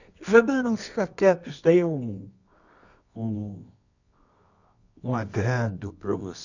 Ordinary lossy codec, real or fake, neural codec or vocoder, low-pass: none; fake; codec, 24 kHz, 0.9 kbps, WavTokenizer, medium music audio release; 7.2 kHz